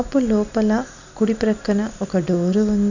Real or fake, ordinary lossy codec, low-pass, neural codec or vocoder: real; none; 7.2 kHz; none